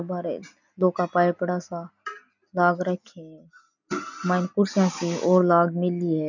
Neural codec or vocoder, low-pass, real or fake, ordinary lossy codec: none; 7.2 kHz; real; none